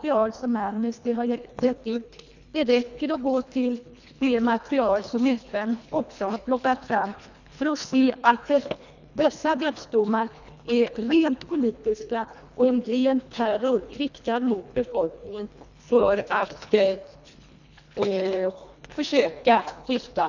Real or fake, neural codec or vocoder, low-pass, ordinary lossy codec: fake; codec, 24 kHz, 1.5 kbps, HILCodec; 7.2 kHz; none